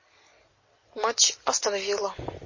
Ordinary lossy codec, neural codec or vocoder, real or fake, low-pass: MP3, 32 kbps; none; real; 7.2 kHz